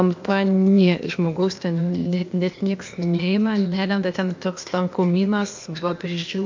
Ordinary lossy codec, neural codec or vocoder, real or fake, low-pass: MP3, 48 kbps; codec, 16 kHz, 0.8 kbps, ZipCodec; fake; 7.2 kHz